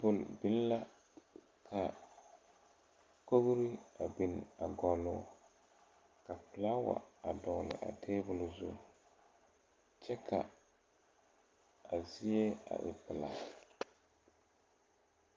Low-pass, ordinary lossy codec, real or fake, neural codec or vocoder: 7.2 kHz; Opus, 24 kbps; real; none